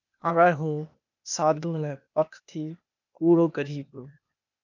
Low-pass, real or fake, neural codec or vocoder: 7.2 kHz; fake; codec, 16 kHz, 0.8 kbps, ZipCodec